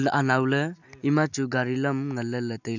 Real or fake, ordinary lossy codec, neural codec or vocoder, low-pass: real; none; none; 7.2 kHz